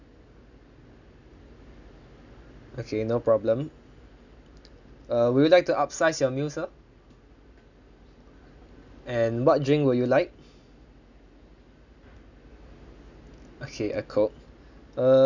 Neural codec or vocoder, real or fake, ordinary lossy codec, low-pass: none; real; none; 7.2 kHz